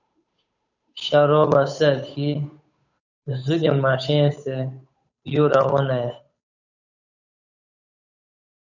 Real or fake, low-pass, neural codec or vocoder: fake; 7.2 kHz; codec, 16 kHz, 8 kbps, FunCodec, trained on Chinese and English, 25 frames a second